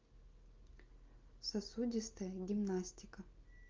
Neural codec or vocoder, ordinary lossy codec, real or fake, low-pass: none; Opus, 24 kbps; real; 7.2 kHz